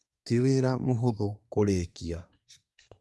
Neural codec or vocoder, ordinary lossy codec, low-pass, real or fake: codec, 24 kHz, 1 kbps, SNAC; none; none; fake